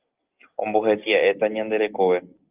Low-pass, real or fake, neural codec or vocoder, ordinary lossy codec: 3.6 kHz; real; none; Opus, 32 kbps